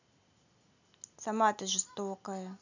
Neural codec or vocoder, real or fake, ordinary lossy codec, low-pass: none; real; none; 7.2 kHz